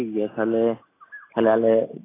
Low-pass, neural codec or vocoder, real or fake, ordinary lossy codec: 3.6 kHz; none; real; AAC, 16 kbps